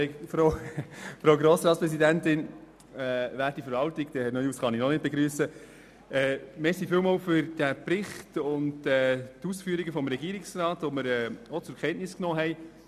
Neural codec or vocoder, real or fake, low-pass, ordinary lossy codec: none; real; 14.4 kHz; none